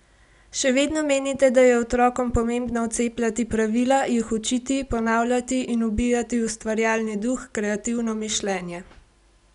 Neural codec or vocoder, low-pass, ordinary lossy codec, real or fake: none; 10.8 kHz; none; real